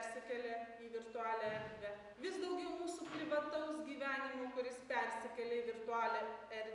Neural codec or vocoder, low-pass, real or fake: none; 10.8 kHz; real